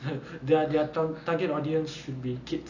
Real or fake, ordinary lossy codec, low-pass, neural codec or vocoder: real; none; 7.2 kHz; none